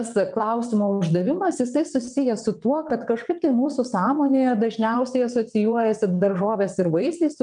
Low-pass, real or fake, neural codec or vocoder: 9.9 kHz; fake; vocoder, 22.05 kHz, 80 mel bands, WaveNeXt